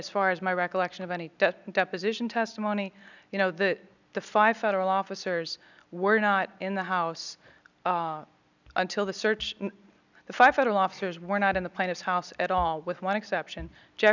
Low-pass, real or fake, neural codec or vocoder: 7.2 kHz; real; none